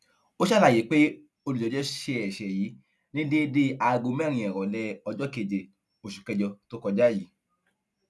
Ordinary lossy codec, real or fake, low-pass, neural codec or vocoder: none; real; none; none